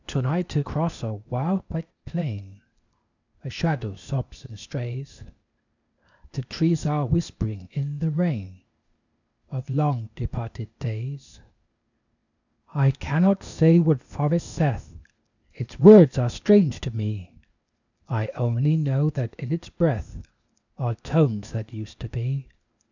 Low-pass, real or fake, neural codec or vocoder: 7.2 kHz; fake; codec, 16 kHz, 0.8 kbps, ZipCodec